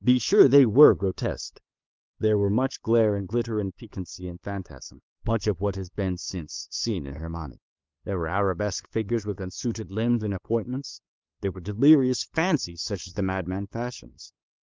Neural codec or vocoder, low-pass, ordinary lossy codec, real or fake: codec, 16 kHz, 8 kbps, FunCodec, trained on LibriTTS, 25 frames a second; 7.2 kHz; Opus, 32 kbps; fake